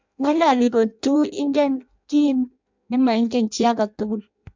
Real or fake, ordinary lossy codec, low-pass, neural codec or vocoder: fake; MP3, 64 kbps; 7.2 kHz; codec, 16 kHz in and 24 kHz out, 0.6 kbps, FireRedTTS-2 codec